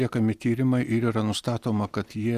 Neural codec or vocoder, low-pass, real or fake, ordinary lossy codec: none; 14.4 kHz; real; Opus, 64 kbps